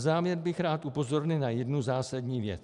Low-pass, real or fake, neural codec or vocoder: 10.8 kHz; real; none